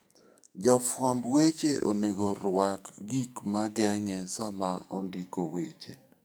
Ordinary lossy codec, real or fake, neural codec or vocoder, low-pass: none; fake; codec, 44.1 kHz, 2.6 kbps, SNAC; none